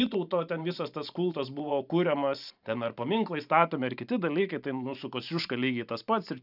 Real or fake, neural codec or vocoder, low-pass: fake; vocoder, 44.1 kHz, 128 mel bands every 512 samples, BigVGAN v2; 5.4 kHz